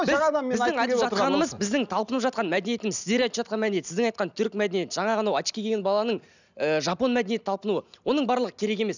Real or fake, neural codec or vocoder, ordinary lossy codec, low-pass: real; none; none; 7.2 kHz